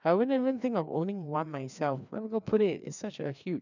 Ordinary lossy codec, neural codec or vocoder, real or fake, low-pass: none; codec, 16 kHz, 2 kbps, FreqCodec, larger model; fake; 7.2 kHz